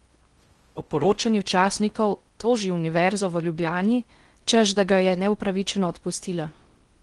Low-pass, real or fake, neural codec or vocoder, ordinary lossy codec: 10.8 kHz; fake; codec, 16 kHz in and 24 kHz out, 0.6 kbps, FocalCodec, streaming, 4096 codes; Opus, 24 kbps